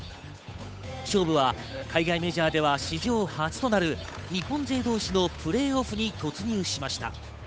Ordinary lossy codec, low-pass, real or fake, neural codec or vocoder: none; none; fake; codec, 16 kHz, 8 kbps, FunCodec, trained on Chinese and English, 25 frames a second